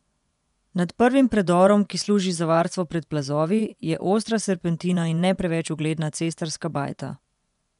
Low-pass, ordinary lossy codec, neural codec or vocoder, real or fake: 10.8 kHz; none; vocoder, 24 kHz, 100 mel bands, Vocos; fake